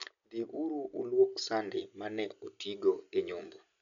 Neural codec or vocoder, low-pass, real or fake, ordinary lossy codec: none; 7.2 kHz; real; none